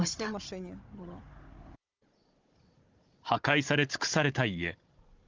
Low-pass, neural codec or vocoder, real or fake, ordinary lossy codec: 7.2 kHz; codec, 16 kHz, 16 kbps, FunCodec, trained on Chinese and English, 50 frames a second; fake; Opus, 32 kbps